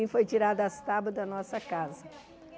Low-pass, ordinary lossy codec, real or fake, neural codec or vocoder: none; none; real; none